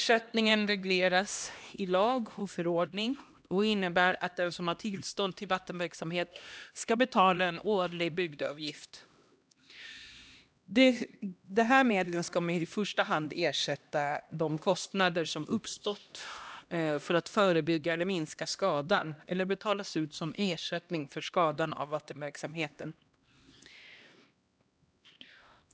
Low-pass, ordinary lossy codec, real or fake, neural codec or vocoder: none; none; fake; codec, 16 kHz, 1 kbps, X-Codec, HuBERT features, trained on LibriSpeech